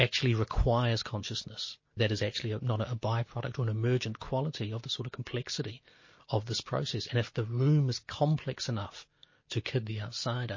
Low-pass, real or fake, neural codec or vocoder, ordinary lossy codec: 7.2 kHz; real; none; MP3, 32 kbps